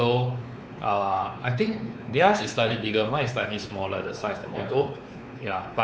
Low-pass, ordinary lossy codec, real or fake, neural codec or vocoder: none; none; fake; codec, 16 kHz, 4 kbps, X-Codec, WavLM features, trained on Multilingual LibriSpeech